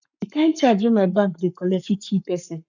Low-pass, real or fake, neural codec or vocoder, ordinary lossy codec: 7.2 kHz; fake; codec, 44.1 kHz, 7.8 kbps, Pupu-Codec; none